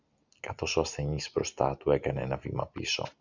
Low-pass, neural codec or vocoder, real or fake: 7.2 kHz; none; real